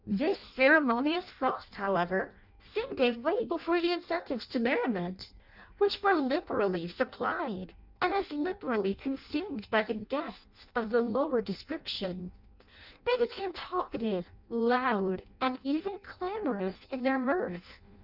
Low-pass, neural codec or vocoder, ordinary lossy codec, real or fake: 5.4 kHz; codec, 16 kHz in and 24 kHz out, 0.6 kbps, FireRedTTS-2 codec; Opus, 64 kbps; fake